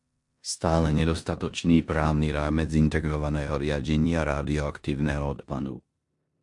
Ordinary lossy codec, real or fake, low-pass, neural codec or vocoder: MP3, 64 kbps; fake; 10.8 kHz; codec, 16 kHz in and 24 kHz out, 0.9 kbps, LongCat-Audio-Codec, four codebook decoder